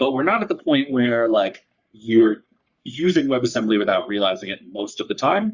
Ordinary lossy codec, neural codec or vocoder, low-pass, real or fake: Opus, 64 kbps; codec, 44.1 kHz, 3.4 kbps, Pupu-Codec; 7.2 kHz; fake